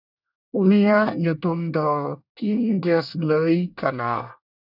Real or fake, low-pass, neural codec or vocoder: fake; 5.4 kHz; codec, 24 kHz, 1 kbps, SNAC